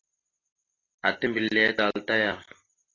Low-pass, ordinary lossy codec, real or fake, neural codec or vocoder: 7.2 kHz; AAC, 32 kbps; real; none